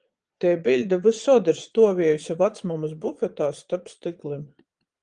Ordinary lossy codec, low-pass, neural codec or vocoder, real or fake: Opus, 24 kbps; 9.9 kHz; vocoder, 22.05 kHz, 80 mel bands, Vocos; fake